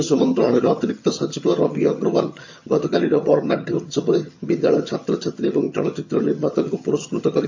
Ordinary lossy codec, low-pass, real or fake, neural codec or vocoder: MP3, 48 kbps; 7.2 kHz; fake; vocoder, 22.05 kHz, 80 mel bands, HiFi-GAN